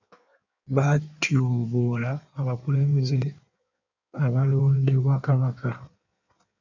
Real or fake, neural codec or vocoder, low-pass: fake; codec, 16 kHz in and 24 kHz out, 1.1 kbps, FireRedTTS-2 codec; 7.2 kHz